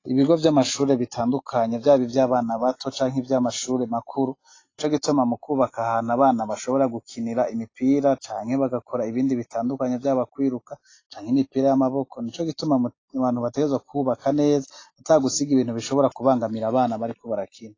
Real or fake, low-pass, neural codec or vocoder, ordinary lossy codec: real; 7.2 kHz; none; AAC, 32 kbps